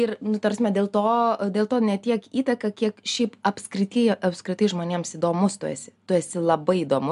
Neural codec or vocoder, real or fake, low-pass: none; real; 10.8 kHz